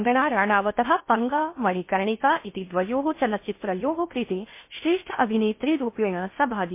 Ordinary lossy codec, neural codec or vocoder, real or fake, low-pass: MP3, 24 kbps; codec, 16 kHz in and 24 kHz out, 0.6 kbps, FocalCodec, streaming, 4096 codes; fake; 3.6 kHz